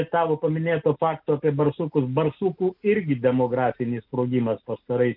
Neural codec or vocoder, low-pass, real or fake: none; 5.4 kHz; real